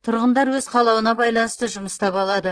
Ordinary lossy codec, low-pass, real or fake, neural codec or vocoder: Opus, 16 kbps; 9.9 kHz; fake; vocoder, 44.1 kHz, 128 mel bands, Pupu-Vocoder